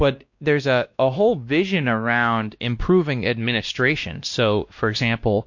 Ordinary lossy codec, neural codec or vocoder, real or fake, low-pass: MP3, 48 kbps; codec, 16 kHz, 1 kbps, X-Codec, WavLM features, trained on Multilingual LibriSpeech; fake; 7.2 kHz